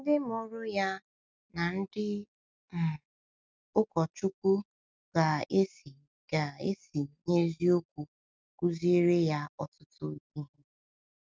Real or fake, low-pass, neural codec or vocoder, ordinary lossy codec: real; none; none; none